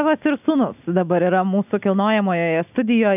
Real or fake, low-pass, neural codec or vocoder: real; 3.6 kHz; none